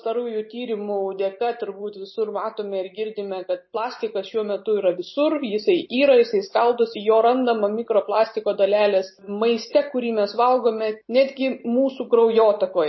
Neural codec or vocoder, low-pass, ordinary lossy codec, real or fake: none; 7.2 kHz; MP3, 24 kbps; real